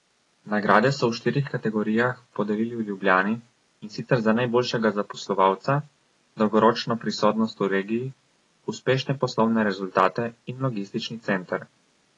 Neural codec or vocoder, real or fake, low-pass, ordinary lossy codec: none; real; 10.8 kHz; AAC, 32 kbps